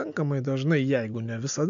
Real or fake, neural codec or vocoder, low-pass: real; none; 7.2 kHz